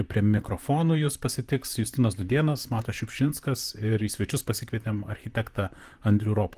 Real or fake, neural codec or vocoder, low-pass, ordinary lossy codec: fake; vocoder, 44.1 kHz, 128 mel bands, Pupu-Vocoder; 14.4 kHz; Opus, 24 kbps